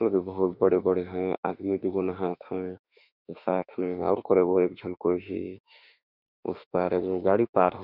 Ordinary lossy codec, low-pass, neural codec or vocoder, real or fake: none; 5.4 kHz; autoencoder, 48 kHz, 32 numbers a frame, DAC-VAE, trained on Japanese speech; fake